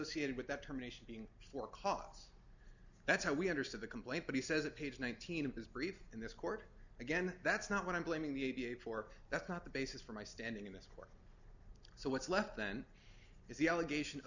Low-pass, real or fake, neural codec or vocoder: 7.2 kHz; real; none